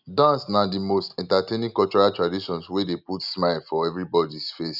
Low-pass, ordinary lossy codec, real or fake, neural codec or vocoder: 5.4 kHz; none; real; none